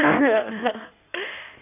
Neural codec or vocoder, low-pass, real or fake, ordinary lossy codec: codec, 16 kHz in and 24 kHz out, 1.1 kbps, FireRedTTS-2 codec; 3.6 kHz; fake; none